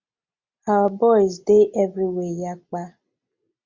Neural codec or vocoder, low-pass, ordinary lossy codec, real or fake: none; 7.2 kHz; MP3, 64 kbps; real